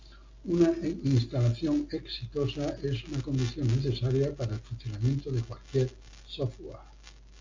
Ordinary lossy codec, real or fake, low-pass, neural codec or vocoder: MP3, 48 kbps; real; 7.2 kHz; none